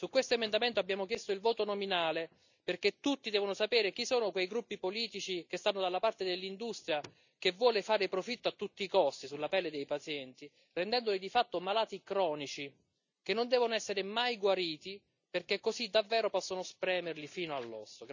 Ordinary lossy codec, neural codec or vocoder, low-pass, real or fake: none; none; 7.2 kHz; real